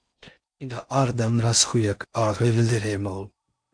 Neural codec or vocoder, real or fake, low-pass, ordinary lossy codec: codec, 16 kHz in and 24 kHz out, 0.6 kbps, FocalCodec, streaming, 4096 codes; fake; 9.9 kHz; MP3, 64 kbps